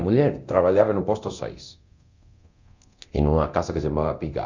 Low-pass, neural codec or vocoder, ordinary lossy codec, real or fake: 7.2 kHz; codec, 24 kHz, 0.9 kbps, DualCodec; Opus, 64 kbps; fake